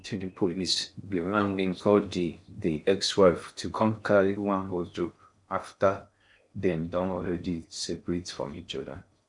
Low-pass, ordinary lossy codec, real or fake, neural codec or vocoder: 10.8 kHz; none; fake; codec, 16 kHz in and 24 kHz out, 0.6 kbps, FocalCodec, streaming, 4096 codes